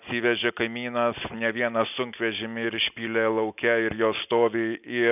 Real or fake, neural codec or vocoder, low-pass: real; none; 3.6 kHz